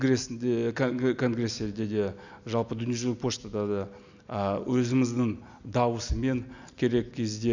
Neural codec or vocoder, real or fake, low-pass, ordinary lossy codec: none; real; 7.2 kHz; none